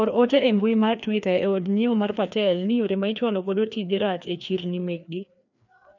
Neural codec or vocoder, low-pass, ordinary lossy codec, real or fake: codec, 16 kHz, 2 kbps, FreqCodec, larger model; 7.2 kHz; none; fake